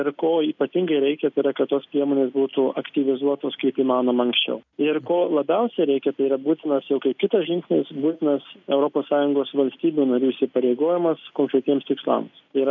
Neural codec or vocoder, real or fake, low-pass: none; real; 7.2 kHz